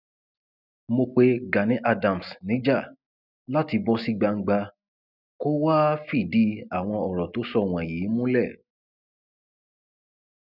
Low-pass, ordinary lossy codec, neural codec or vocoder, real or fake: 5.4 kHz; none; none; real